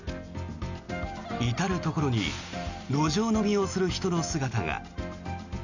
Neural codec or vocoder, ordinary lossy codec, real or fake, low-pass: none; none; real; 7.2 kHz